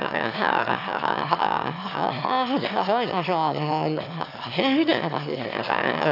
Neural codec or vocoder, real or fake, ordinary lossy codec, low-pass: autoencoder, 22.05 kHz, a latent of 192 numbers a frame, VITS, trained on one speaker; fake; none; 5.4 kHz